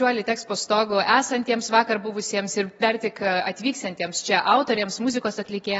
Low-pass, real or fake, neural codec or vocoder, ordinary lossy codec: 14.4 kHz; real; none; AAC, 24 kbps